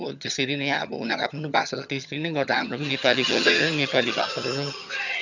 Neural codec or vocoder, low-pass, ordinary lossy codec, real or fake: vocoder, 22.05 kHz, 80 mel bands, HiFi-GAN; 7.2 kHz; none; fake